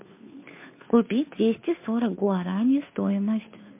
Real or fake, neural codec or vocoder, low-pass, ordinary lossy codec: fake; codec, 16 kHz, 2 kbps, FunCodec, trained on Chinese and English, 25 frames a second; 3.6 kHz; MP3, 24 kbps